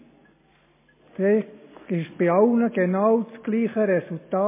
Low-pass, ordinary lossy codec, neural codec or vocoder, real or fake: 3.6 kHz; MP3, 16 kbps; none; real